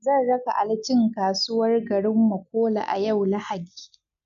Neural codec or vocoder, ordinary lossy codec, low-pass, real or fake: none; none; 7.2 kHz; real